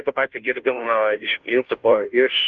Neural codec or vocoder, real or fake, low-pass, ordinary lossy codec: codec, 16 kHz, 0.5 kbps, FunCodec, trained on Chinese and English, 25 frames a second; fake; 7.2 kHz; Opus, 32 kbps